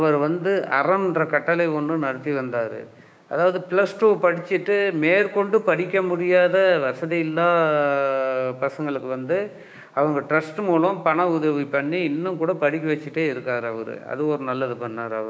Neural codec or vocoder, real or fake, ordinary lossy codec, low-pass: codec, 16 kHz, 6 kbps, DAC; fake; none; none